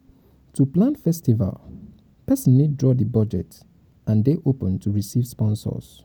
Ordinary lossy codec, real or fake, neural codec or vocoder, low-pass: none; real; none; none